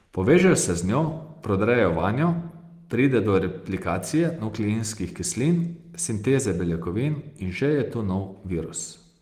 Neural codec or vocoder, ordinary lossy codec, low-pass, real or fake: none; Opus, 24 kbps; 14.4 kHz; real